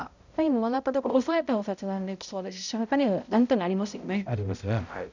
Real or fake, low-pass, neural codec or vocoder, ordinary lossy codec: fake; 7.2 kHz; codec, 16 kHz, 0.5 kbps, X-Codec, HuBERT features, trained on balanced general audio; none